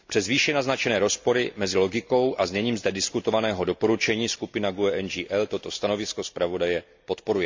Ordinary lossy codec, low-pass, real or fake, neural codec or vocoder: MP3, 64 kbps; 7.2 kHz; real; none